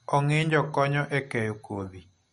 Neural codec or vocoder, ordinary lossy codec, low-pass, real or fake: none; MP3, 48 kbps; 14.4 kHz; real